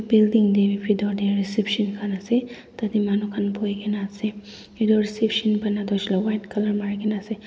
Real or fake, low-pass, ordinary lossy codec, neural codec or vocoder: real; none; none; none